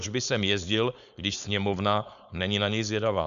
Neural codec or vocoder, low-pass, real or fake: codec, 16 kHz, 4.8 kbps, FACodec; 7.2 kHz; fake